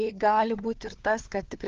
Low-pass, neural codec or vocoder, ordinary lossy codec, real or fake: 7.2 kHz; codec, 16 kHz, 4 kbps, FunCodec, trained on LibriTTS, 50 frames a second; Opus, 16 kbps; fake